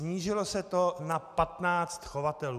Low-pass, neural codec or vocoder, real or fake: 14.4 kHz; none; real